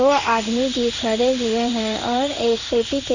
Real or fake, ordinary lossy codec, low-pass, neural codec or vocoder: fake; none; 7.2 kHz; codec, 16 kHz in and 24 kHz out, 1 kbps, XY-Tokenizer